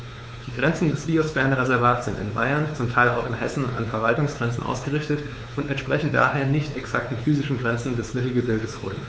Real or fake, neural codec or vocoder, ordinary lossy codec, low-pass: fake; codec, 16 kHz, 4 kbps, X-Codec, WavLM features, trained on Multilingual LibriSpeech; none; none